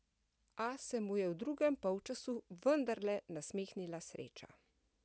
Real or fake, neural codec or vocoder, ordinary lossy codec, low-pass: real; none; none; none